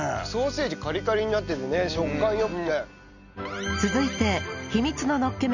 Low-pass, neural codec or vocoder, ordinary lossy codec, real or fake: 7.2 kHz; none; none; real